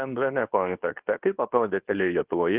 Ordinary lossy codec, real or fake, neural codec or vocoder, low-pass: Opus, 32 kbps; fake; codec, 24 kHz, 0.9 kbps, WavTokenizer, medium speech release version 2; 3.6 kHz